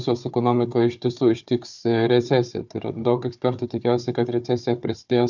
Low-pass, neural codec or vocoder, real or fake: 7.2 kHz; codec, 16 kHz, 4 kbps, FunCodec, trained on Chinese and English, 50 frames a second; fake